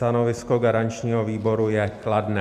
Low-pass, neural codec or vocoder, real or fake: 14.4 kHz; none; real